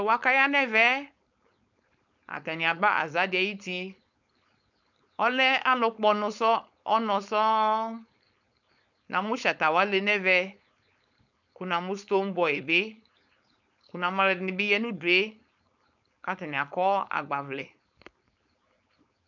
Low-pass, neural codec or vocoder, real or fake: 7.2 kHz; codec, 16 kHz, 4.8 kbps, FACodec; fake